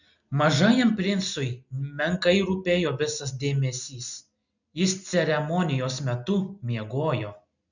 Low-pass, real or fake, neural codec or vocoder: 7.2 kHz; real; none